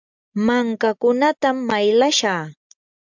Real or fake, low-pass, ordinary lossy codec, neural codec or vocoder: real; 7.2 kHz; MP3, 64 kbps; none